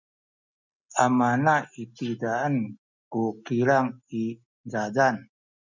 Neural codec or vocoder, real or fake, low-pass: none; real; 7.2 kHz